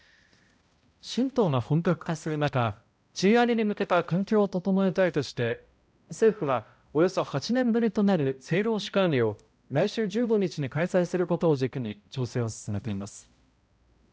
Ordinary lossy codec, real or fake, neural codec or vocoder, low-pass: none; fake; codec, 16 kHz, 0.5 kbps, X-Codec, HuBERT features, trained on balanced general audio; none